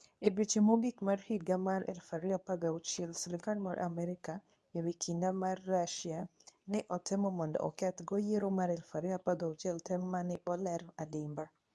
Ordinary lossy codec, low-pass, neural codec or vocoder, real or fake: none; none; codec, 24 kHz, 0.9 kbps, WavTokenizer, medium speech release version 2; fake